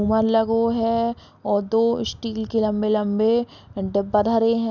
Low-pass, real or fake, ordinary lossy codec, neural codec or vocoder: 7.2 kHz; real; none; none